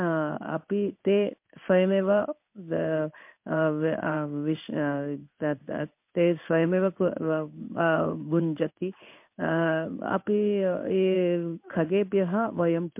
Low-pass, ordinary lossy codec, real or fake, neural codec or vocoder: 3.6 kHz; MP3, 32 kbps; fake; codec, 16 kHz in and 24 kHz out, 1 kbps, XY-Tokenizer